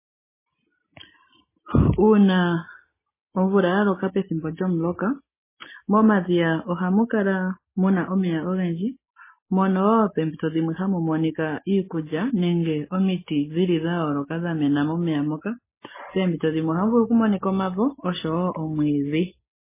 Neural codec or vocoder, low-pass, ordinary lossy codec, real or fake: none; 3.6 kHz; MP3, 16 kbps; real